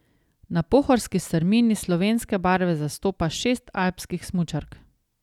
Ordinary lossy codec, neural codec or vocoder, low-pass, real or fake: none; none; 19.8 kHz; real